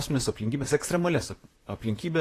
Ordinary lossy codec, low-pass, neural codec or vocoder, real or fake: AAC, 48 kbps; 14.4 kHz; vocoder, 44.1 kHz, 128 mel bands, Pupu-Vocoder; fake